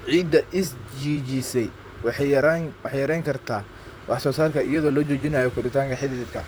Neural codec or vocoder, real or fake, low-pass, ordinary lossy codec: vocoder, 44.1 kHz, 128 mel bands, Pupu-Vocoder; fake; none; none